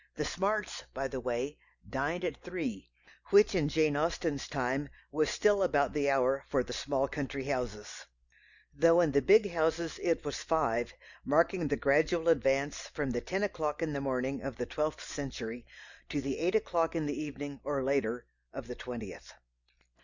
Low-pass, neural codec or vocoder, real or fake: 7.2 kHz; none; real